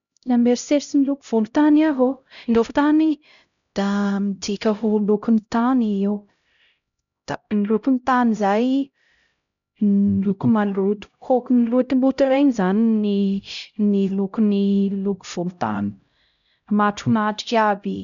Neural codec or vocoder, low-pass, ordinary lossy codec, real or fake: codec, 16 kHz, 0.5 kbps, X-Codec, HuBERT features, trained on LibriSpeech; 7.2 kHz; none; fake